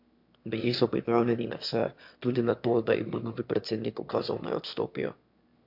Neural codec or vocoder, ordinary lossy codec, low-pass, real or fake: autoencoder, 22.05 kHz, a latent of 192 numbers a frame, VITS, trained on one speaker; MP3, 48 kbps; 5.4 kHz; fake